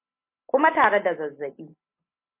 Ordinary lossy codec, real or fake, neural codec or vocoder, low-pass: MP3, 24 kbps; real; none; 3.6 kHz